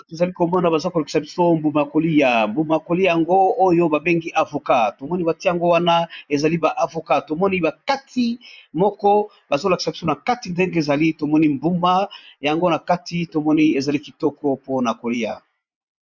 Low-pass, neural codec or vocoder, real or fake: 7.2 kHz; vocoder, 24 kHz, 100 mel bands, Vocos; fake